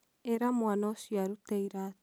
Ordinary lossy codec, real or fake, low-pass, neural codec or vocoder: none; real; none; none